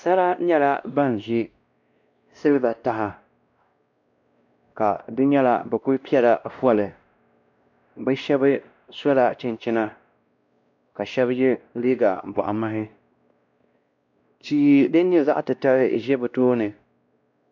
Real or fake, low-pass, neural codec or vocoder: fake; 7.2 kHz; codec, 16 kHz, 1 kbps, X-Codec, WavLM features, trained on Multilingual LibriSpeech